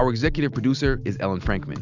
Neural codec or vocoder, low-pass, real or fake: none; 7.2 kHz; real